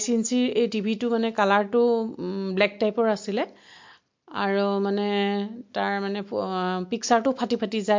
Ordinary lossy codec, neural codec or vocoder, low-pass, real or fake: MP3, 48 kbps; none; 7.2 kHz; real